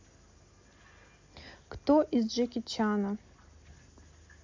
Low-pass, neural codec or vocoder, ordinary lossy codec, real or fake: 7.2 kHz; none; MP3, 64 kbps; real